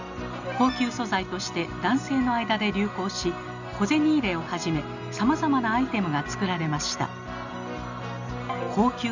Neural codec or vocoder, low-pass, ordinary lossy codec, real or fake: none; 7.2 kHz; none; real